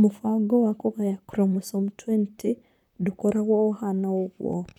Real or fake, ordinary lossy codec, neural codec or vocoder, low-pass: fake; none; vocoder, 44.1 kHz, 128 mel bands, Pupu-Vocoder; 19.8 kHz